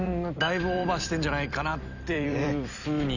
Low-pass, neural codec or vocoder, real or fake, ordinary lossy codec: 7.2 kHz; vocoder, 44.1 kHz, 128 mel bands every 256 samples, BigVGAN v2; fake; none